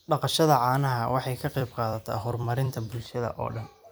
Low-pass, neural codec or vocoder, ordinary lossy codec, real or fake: none; vocoder, 44.1 kHz, 128 mel bands every 256 samples, BigVGAN v2; none; fake